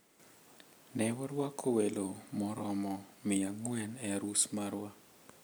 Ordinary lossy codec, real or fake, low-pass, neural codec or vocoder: none; real; none; none